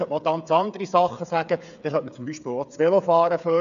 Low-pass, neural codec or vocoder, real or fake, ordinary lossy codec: 7.2 kHz; codec, 16 kHz, 8 kbps, FreqCodec, smaller model; fake; none